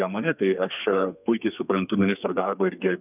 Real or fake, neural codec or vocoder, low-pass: fake; codec, 32 kHz, 1.9 kbps, SNAC; 3.6 kHz